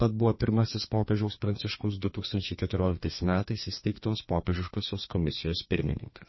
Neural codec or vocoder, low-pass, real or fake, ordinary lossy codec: codec, 32 kHz, 1.9 kbps, SNAC; 7.2 kHz; fake; MP3, 24 kbps